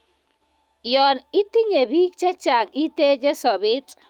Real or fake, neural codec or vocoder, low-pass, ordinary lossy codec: fake; autoencoder, 48 kHz, 128 numbers a frame, DAC-VAE, trained on Japanese speech; 19.8 kHz; Opus, 32 kbps